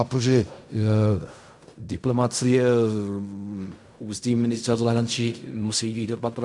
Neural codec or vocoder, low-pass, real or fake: codec, 16 kHz in and 24 kHz out, 0.4 kbps, LongCat-Audio-Codec, fine tuned four codebook decoder; 10.8 kHz; fake